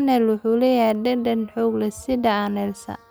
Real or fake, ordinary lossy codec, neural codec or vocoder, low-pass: real; none; none; none